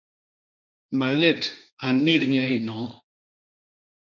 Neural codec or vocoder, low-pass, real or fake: codec, 16 kHz, 1.1 kbps, Voila-Tokenizer; 7.2 kHz; fake